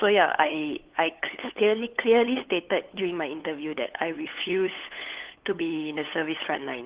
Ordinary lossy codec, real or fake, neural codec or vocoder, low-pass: Opus, 16 kbps; fake; codec, 16 kHz, 8 kbps, FunCodec, trained on LibriTTS, 25 frames a second; 3.6 kHz